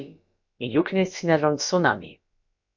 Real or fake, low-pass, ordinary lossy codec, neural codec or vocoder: fake; 7.2 kHz; MP3, 48 kbps; codec, 16 kHz, about 1 kbps, DyCAST, with the encoder's durations